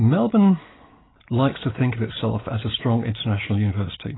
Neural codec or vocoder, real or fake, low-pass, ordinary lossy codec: none; real; 7.2 kHz; AAC, 16 kbps